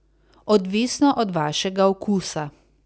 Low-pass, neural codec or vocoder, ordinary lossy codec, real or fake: none; none; none; real